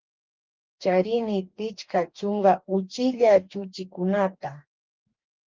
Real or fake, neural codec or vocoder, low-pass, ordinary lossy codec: fake; codec, 44.1 kHz, 2.6 kbps, DAC; 7.2 kHz; Opus, 32 kbps